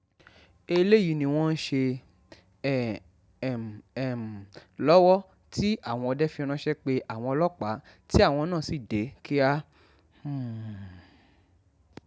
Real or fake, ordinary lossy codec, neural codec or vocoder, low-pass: real; none; none; none